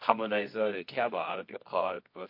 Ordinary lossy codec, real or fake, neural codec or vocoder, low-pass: MP3, 32 kbps; fake; codec, 24 kHz, 0.9 kbps, WavTokenizer, medium music audio release; 5.4 kHz